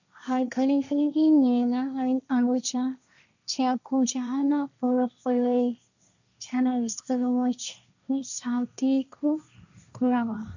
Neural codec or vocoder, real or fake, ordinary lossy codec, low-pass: codec, 16 kHz, 1.1 kbps, Voila-Tokenizer; fake; none; 7.2 kHz